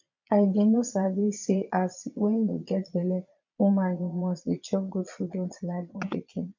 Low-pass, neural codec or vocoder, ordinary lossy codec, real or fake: 7.2 kHz; vocoder, 22.05 kHz, 80 mel bands, Vocos; MP3, 64 kbps; fake